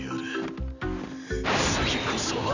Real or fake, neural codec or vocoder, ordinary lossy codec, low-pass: real; none; none; 7.2 kHz